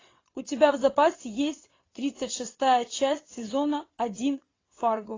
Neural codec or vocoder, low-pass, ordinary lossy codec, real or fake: vocoder, 22.05 kHz, 80 mel bands, WaveNeXt; 7.2 kHz; AAC, 32 kbps; fake